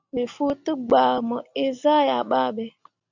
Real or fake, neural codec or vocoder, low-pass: real; none; 7.2 kHz